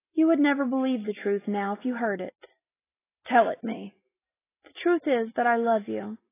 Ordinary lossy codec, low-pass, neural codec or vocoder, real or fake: AAC, 16 kbps; 3.6 kHz; none; real